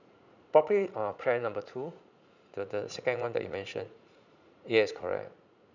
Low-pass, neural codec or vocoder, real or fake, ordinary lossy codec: 7.2 kHz; vocoder, 44.1 kHz, 80 mel bands, Vocos; fake; none